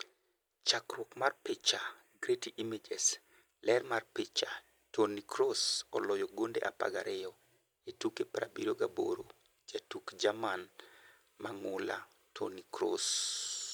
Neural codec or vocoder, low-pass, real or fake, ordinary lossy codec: none; none; real; none